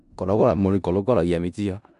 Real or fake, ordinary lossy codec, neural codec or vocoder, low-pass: fake; none; codec, 16 kHz in and 24 kHz out, 0.4 kbps, LongCat-Audio-Codec, four codebook decoder; 10.8 kHz